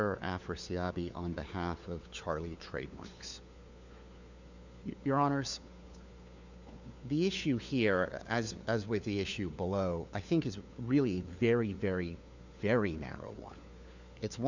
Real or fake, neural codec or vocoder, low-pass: fake; codec, 16 kHz, 4 kbps, FunCodec, trained on LibriTTS, 50 frames a second; 7.2 kHz